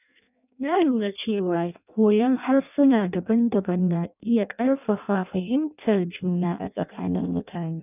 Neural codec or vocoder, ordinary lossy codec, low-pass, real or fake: codec, 16 kHz in and 24 kHz out, 0.6 kbps, FireRedTTS-2 codec; none; 3.6 kHz; fake